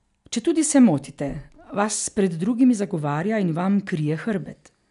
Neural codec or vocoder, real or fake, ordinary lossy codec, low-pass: none; real; none; 10.8 kHz